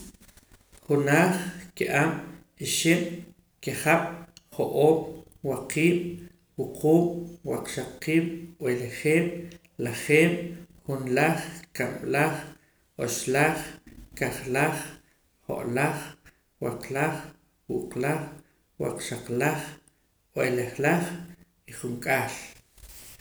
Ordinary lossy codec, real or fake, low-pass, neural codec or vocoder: none; real; none; none